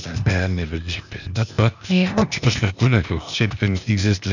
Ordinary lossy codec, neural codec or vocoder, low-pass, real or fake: none; codec, 16 kHz, 0.8 kbps, ZipCodec; 7.2 kHz; fake